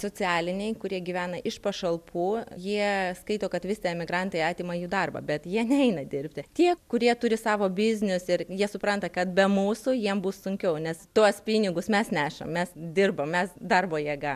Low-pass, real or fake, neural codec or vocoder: 14.4 kHz; real; none